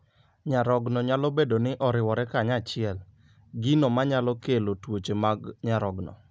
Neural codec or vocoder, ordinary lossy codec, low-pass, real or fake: none; none; none; real